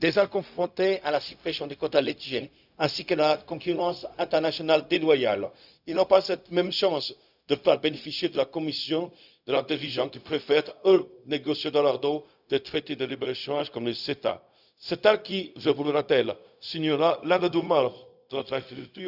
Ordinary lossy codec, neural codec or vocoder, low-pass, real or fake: none; codec, 16 kHz, 0.4 kbps, LongCat-Audio-Codec; 5.4 kHz; fake